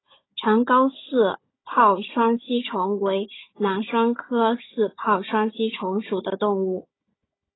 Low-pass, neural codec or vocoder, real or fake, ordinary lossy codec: 7.2 kHz; codec, 16 kHz, 16 kbps, FunCodec, trained on Chinese and English, 50 frames a second; fake; AAC, 16 kbps